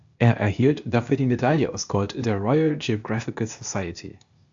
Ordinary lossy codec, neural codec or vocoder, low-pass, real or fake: AAC, 64 kbps; codec, 16 kHz, 0.8 kbps, ZipCodec; 7.2 kHz; fake